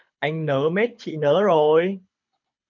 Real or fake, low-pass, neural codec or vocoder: fake; 7.2 kHz; codec, 24 kHz, 6 kbps, HILCodec